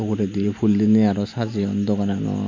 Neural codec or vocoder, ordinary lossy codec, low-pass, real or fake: none; MP3, 48 kbps; 7.2 kHz; real